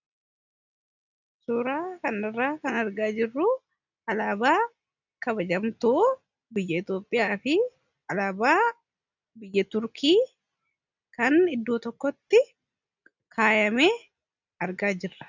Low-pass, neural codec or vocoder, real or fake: 7.2 kHz; none; real